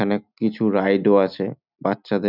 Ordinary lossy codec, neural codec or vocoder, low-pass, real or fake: none; none; 5.4 kHz; real